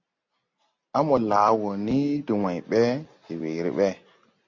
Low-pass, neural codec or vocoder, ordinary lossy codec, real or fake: 7.2 kHz; none; MP3, 48 kbps; real